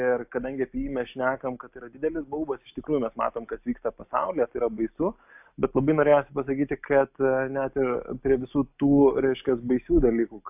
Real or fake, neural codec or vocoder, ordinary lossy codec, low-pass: real; none; AAC, 32 kbps; 3.6 kHz